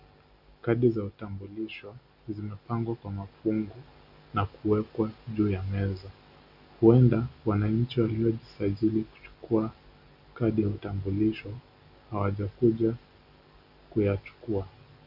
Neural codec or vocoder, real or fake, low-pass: none; real; 5.4 kHz